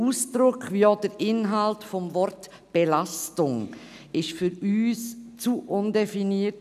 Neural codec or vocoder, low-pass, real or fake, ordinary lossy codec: none; 14.4 kHz; real; none